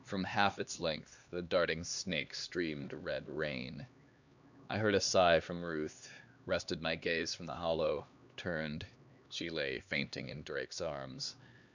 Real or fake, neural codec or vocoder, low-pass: fake; codec, 16 kHz, 2 kbps, X-Codec, HuBERT features, trained on LibriSpeech; 7.2 kHz